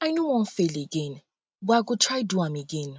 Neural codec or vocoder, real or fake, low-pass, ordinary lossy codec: none; real; none; none